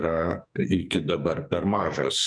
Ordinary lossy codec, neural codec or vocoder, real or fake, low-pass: MP3, 96 kbps; codec, 44.1 kHz, 2.6 kbps, SNAC; fake; 9.9 kHz